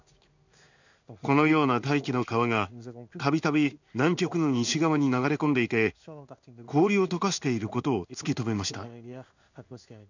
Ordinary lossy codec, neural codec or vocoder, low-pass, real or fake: none; codec, 16 kHz in and 24 kHz out, 1 kbps, XY-Tokenizer; 7.2 kHz; fake